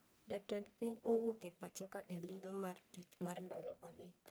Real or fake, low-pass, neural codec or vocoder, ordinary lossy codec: fake; none; codec, 44.1 kHz, 1.7 kbps, Pupu-Codec; none